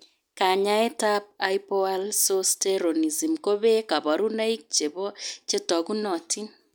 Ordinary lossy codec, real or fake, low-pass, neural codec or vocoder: none; real; none; none